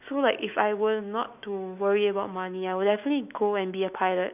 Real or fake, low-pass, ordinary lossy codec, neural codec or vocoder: fake; 3.6 kHz; none; autoencoder, 48 kHz, 128 numbers a frame, DAC-VAE, trained on Japanese speech